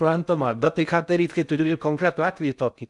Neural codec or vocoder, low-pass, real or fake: codec, 16 kHz in and 24 kHz out, 0.6 kbps, FocalCodec, streaming, 2048 codes; 10.8 kHz; fake